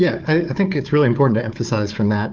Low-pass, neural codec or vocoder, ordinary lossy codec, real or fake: 7.2 kHz; codec, 16 kHz, 4 kbps, FunCodec, trained on Chinese and English, 50 frames a second; Opus, 32 kbps; fake